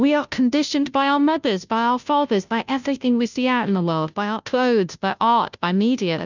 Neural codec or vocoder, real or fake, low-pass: codec, 16 kHz, 0.5 kbps, FunCodec, trained on Chinese and English, 25 frames a second; fake; 7.2 kHz